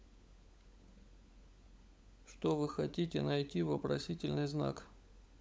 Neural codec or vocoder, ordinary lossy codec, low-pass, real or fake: codec, 16 kHz, 16 kbps, FunCodec, trained on LibriTTS, 50 frames a second; none; none; fake